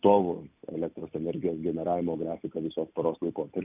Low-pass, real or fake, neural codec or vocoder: 3.6 kHz; real; none